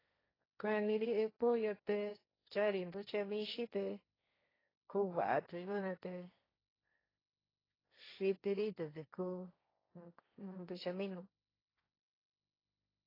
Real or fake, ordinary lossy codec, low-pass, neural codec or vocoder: fake; AAC, 24 kbps; 5.4 kHz; codec, 16 kHz, 1.1 kbps, Voila-Tokenizer